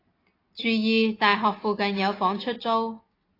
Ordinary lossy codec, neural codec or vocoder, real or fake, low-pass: AAC, 24 kbps; none; real; 5.4 kHz